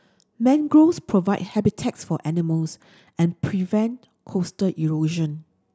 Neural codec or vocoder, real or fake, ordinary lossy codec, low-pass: none; real; none; none